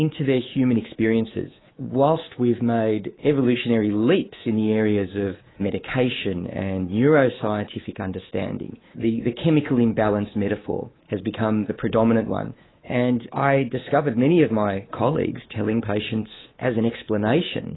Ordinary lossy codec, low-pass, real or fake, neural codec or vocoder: AAC, 16 kbps; 7.2 kHz; fake; codec, 24 kHz, 3.1 kbps, DualCodec